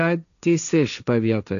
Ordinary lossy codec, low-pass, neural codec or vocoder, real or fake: MP3, 96 kbps; 7.2 kHz; codec, 16 kHz, 1.1 kbps, Voila-Tokenizer; fake